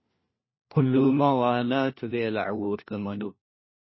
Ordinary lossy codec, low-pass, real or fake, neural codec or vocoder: MP3, 24 kbps; 7.2 kHz; fake; codec, 16 kHz, 1 kbps, FunCodec, trained on LibriTTS, 50 frames a second